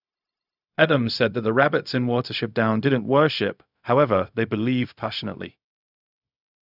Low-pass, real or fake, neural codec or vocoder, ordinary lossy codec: 5.4 kHz; fake; codec, 16 kHz, 0.4 kbps, LongCat-Audio-Codec; none